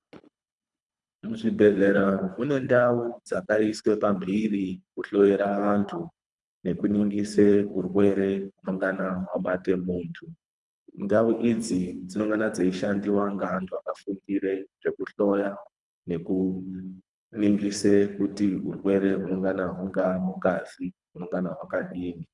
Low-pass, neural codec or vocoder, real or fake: 10.8 kHz; codec, 24 kHz, 3 kbps, HILCodec; fake